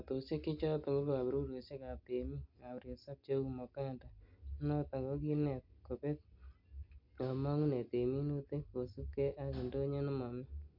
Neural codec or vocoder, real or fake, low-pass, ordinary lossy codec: none; real; 5.4 kHz; none